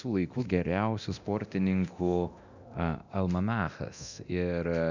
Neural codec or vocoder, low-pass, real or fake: codec, 24 kHz, 0.9 kbps, DualCodec; 7.2 kHz; fake